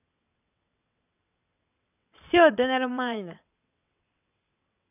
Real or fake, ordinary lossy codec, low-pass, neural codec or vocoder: fake; none; 3.6 kHz; vocoder, 22.05 kHz, 80 mel bands, WaveNeXt